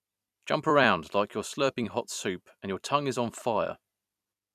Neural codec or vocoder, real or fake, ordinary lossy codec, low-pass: vocoder, 44.1 kHz, 128 mel bands every 256 samples, BigVGAN v2; fake; none; 14.4 kHz